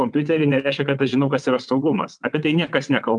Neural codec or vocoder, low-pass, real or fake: vocoder, 22.05 kHz, 80 mel bands, WaveNeXt; 9.9 kHz; fake